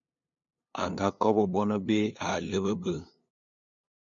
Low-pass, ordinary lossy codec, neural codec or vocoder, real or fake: 7.2 kHz; AAC, 64 kbps; codec, 16 kHz, 2 kbps, FunCodec, trained on LibriTTS, 25 frames a second; fake